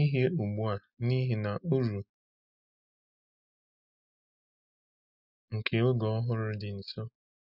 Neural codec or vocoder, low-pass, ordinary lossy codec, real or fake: none; 5.4 kHz; none; real